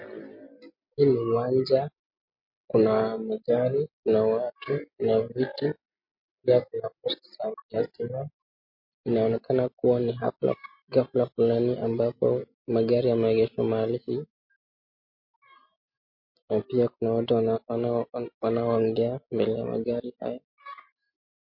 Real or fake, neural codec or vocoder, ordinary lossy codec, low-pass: real; none; MP3, 32 kbps; 5.4 kHz